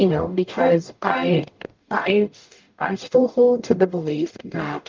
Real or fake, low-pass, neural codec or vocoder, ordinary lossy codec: fake; 7.2 kHz; codec, 44.1 kHz, 0.9 kbps, DAC; Opus, 24 kbps